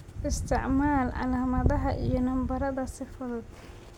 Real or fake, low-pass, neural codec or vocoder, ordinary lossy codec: real; 19.8 kHz; none; MP3, 96 kbps